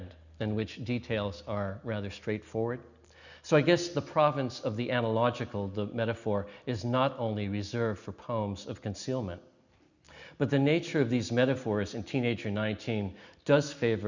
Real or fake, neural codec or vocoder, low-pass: real; none; 7.2 kHz